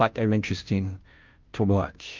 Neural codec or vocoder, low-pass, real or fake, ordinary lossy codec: codec, 16 kHz, 0.5 kbps, FunCodec, trained on Chinese and English, 25 frames a second; 7.2 kHz; fake; Opus, 24 kbps